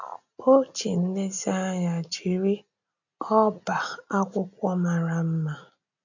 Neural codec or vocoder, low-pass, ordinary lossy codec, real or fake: none; 7.2 kHz; none; real